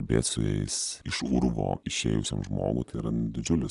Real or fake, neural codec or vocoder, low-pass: real; none; 10.8 kHz